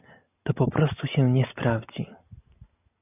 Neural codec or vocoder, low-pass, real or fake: none; 3.6 kHz; real